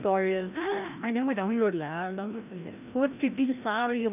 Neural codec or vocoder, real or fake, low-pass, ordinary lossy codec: codec, 16 kHz, 1 kbps, FreqCodec, larger model; fake; 3.6 kHz; none